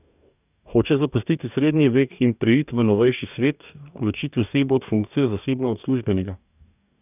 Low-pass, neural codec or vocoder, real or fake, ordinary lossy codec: 3.6 kHz; codec, 44.1 kHz, 2.6 kbps, DAC; fake; none